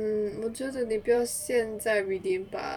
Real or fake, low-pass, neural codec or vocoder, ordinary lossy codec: fake; 19.8 kHz; vocoder, 44.1 kHz, 128 mel bands every 256 samples, BigVGAN v2; none